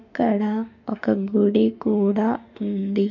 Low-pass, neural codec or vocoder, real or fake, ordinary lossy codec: 7.2 kHz; autoencoder, 48 kHz, 32 numbers a frame, DAC-VAE, trained on Japanese speech; fake; none